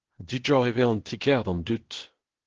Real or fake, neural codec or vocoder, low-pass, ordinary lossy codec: fake; codec, 16 kHz, 0.8 kbps, ZipCodec; 7.2 kHz; Opus, 16 kbps